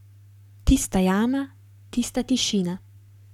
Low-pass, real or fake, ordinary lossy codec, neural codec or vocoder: 19.8 kHz; fake; none; codec, 44.1 kHz, 7.8 kbps, Pupu-Codec